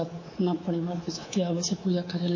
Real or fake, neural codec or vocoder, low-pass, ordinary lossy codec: fake; codec, 24 kHz, 3.1 kbps, DualCodec; 7.2 kHz; MP3, 32 kbps